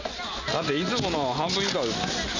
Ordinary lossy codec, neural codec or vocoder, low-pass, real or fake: none; none; 7.2 kHz; real